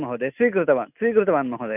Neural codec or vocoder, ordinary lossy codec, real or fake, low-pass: none; none; real; 3.6 kHz